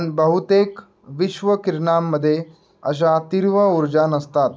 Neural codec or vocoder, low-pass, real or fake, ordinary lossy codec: none; none; real; none